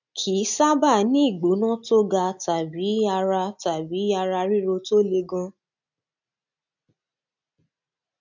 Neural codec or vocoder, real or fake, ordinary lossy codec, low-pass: none; real; none; 7.2 kHz